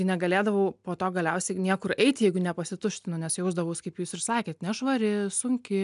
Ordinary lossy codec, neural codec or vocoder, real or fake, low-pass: MP3, 96 kbps; none; real; 10.8 kHz